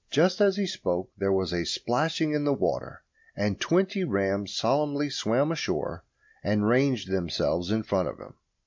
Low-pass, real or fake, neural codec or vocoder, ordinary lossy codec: 7.2 kHz; real; none; MP3, 64 kbps